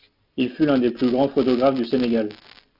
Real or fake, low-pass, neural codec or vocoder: real; 5.4 kHz; none